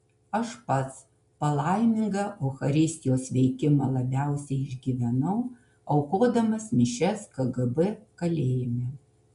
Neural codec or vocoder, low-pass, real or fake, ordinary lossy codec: none; 10.8 kHz; real; MP3, 96 kbps